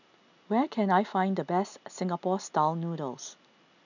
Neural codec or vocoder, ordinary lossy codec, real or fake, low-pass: none; none; real; 7.2 kHz